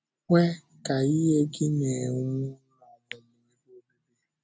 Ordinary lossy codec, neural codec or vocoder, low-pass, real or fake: none; none; none; real